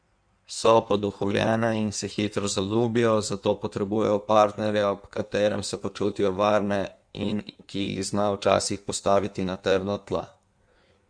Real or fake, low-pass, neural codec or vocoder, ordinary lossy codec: fake; 9.9 kHz; codec, 16 kHz in and 24 kHz out, 1.1 kbps, FireRedTTS-2 codec; none